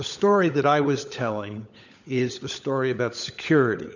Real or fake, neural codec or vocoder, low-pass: fake; codec, 16 kHz, 16 kbps, FunCodec, trained on LibriTTS, 50 frames a second; 7.2 kHz